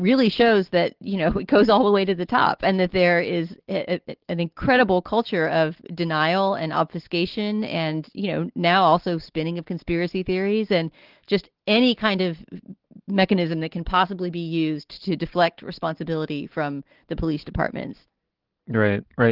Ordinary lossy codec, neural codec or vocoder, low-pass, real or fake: Opus, 16 kbps; none; 5.4 kHz; real